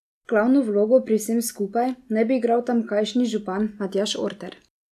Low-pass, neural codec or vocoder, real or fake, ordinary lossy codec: 14.4 kHz; none; real; none